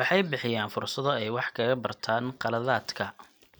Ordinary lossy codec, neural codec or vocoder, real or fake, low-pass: none; none; real; none